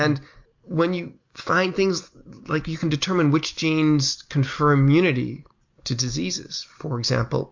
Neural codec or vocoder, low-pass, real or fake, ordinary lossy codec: none; 7.2 kHz; real; MP3, 48 kbps